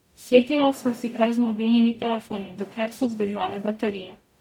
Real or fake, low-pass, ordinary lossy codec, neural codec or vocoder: fake; 19.8 kHz; MP3, 96 kbps; codec, 44.1 kHz, 0.9 kbps, DAC